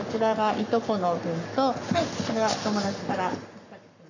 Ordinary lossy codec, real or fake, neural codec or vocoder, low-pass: none; fake; codec, 44.1 kHz, 7.8 kbps, Pupu-Codec; 7.2 kHz